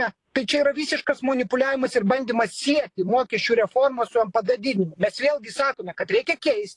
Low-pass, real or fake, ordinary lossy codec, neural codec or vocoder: 10.8 kHz; fake; AAC, 48 kbps; vocoder, 44.1 kHz, 128 mel bands, Pupu-Vocoder